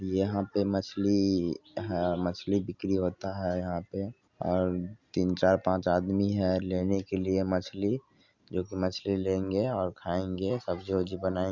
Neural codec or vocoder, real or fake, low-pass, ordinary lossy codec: none; real; 7.2 kHz; Opus, 64 kbps